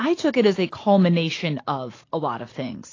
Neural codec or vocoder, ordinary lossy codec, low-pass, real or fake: none; AAC, 32 kbps; 7.2 kHz; real